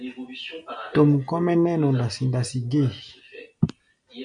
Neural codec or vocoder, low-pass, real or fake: none; 9.9 kHz; real